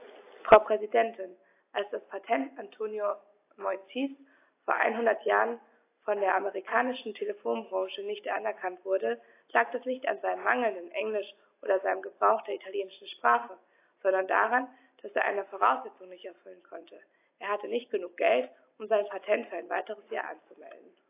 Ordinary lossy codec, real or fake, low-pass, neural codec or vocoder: AAC, 24 kbps; real; 3.6 kHz; none